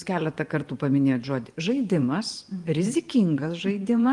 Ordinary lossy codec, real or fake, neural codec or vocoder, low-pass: Opus, 24 kbps; fake; vocoder, 24 kHz, 100 mel bands, Vocos; 10.8 kHz